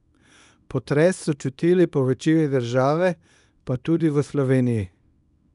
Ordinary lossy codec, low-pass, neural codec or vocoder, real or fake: none; 10.8 kHz; codec, 24 kHz, 0.9 kbps, WavTokenizer, small release; fake